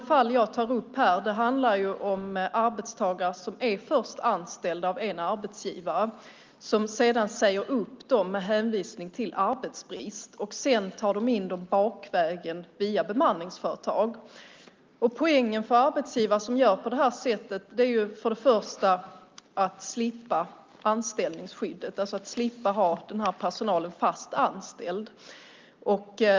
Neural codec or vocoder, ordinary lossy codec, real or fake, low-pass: none; Opus, 24 kbps; real; 7.2 kHz